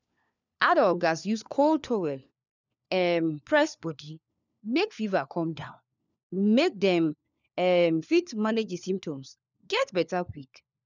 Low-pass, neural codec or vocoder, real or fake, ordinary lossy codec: 7.2 kHz; codec, 16 kHz, 4 kbps, FunCodec, trained on LibriTTS, 50 frames a second; fake; none